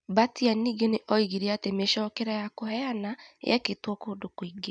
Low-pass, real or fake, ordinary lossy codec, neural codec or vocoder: 9.9 kHz; real; none; none